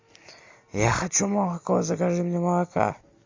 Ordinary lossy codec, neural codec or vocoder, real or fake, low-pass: MP3, 48 kbps; none; real; 7.2 kHz